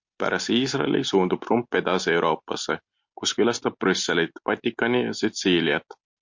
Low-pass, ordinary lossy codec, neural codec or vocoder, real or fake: 7.2 kHz; MP3, 48 kbps; none; real